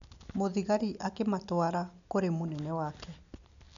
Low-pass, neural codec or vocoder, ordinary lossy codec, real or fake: 7.2 kHz; none; none; real